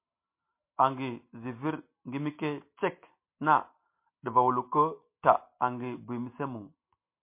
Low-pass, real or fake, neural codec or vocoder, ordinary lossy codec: 3.6 kHz; real; none; MP3, 24 kbps